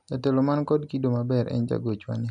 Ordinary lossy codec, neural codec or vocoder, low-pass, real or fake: none; none; 9.9 kHz; real